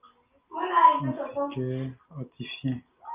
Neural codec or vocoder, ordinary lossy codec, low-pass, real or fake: none; Opus, 24 kbps; 3.6 kHz; real